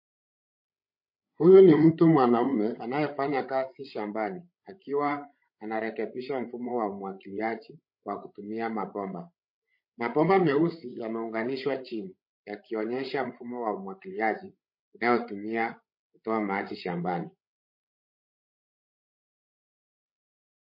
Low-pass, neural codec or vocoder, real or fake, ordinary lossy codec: 5.4 kHz; codec, 16 kHz, 16 kbps, FreqCodec, larger model; fake; MP3, 32 kbps